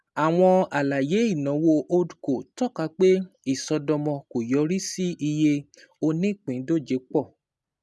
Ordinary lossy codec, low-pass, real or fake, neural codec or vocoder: none; none; real; none